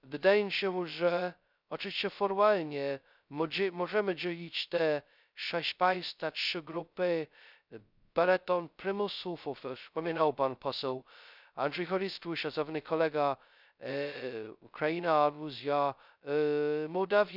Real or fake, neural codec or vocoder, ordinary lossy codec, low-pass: fake; codec, 16 kHz, 0.2 kbps, FocalCodec; none; 5.4 kHz